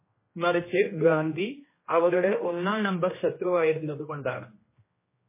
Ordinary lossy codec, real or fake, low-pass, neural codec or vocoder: MP3, 16 kbps; fake; 3.6 kHz; codec, 16 kHz, 1 kbps, X-Codec, HuBERT features, trained on general audio